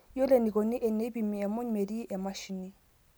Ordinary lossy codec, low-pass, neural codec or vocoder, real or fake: none; none; none; real